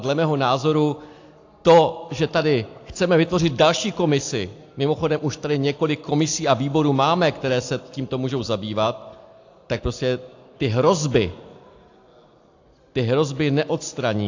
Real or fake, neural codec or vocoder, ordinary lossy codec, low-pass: real; none; AAC, 48 kbps; 7.2 kHz